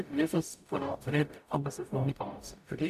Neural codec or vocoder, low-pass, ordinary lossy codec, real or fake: codec, 44.1 kHz, 0.9 kbps, DAC; 14.4 kHz; none; fake